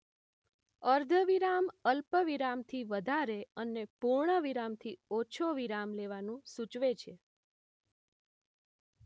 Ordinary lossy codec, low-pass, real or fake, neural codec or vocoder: none; none; real; none